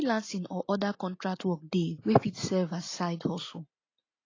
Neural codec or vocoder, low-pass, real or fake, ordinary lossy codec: none; 7.2 kHz; real; AAC, 32 kbps